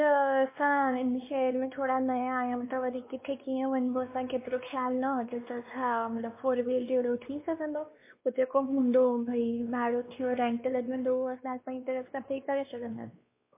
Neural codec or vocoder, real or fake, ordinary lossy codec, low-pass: codec, 16 kHz, 2 kbps, X-Codec, WavLM features, trained on Multilingual LibriSpeech; fake; MP3, 24 kbps; 3.6 kHz